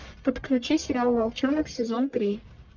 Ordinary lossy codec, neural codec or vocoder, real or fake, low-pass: Opus, 32 kbps; codec, 44.1 kHz, 1.7 kbps, Pupu-Codec; fake; 7.2 kHz